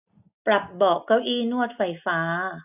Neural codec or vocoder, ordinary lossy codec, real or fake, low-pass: none; none; real; 3.6 kHz